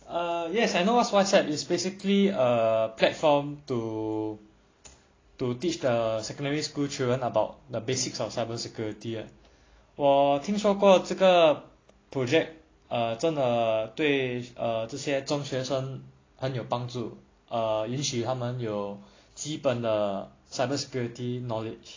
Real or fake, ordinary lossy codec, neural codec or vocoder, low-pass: real; AAC, 32 kbps; none; 7.2 kHz